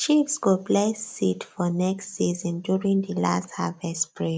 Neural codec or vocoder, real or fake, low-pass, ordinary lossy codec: none; real; none; none